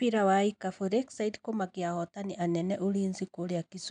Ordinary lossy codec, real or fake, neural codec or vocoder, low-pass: none; real; none; 9.9 kHz